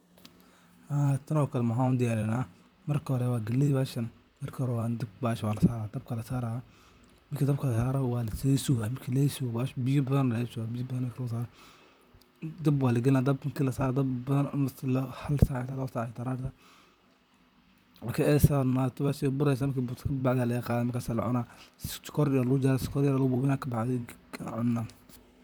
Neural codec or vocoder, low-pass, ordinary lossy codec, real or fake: vocoder, 44.1 kHz, 128 mel bands, Pupu-Vocoder; none; none; fake